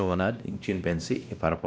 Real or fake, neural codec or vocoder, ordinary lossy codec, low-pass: fake; codec, 16 kHz, 1 kbps, X-Codec, WavLM features, trained on Multilingual LibriSpeech; none; none